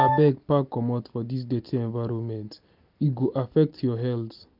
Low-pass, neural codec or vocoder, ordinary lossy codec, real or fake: 5.4 kHz; none; none; real